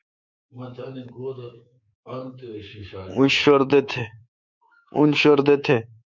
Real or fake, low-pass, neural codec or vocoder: fake; 7.2 kHz; codec, 24 kHz, 3.1 kbps, DualCodec